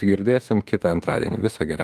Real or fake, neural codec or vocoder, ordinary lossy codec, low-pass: fake; autoencoder, 48 kHz, 32 numbers a frame, DAC-VAE, trained on Japanese speech; Opus, 32 kbps; 14.4 kHz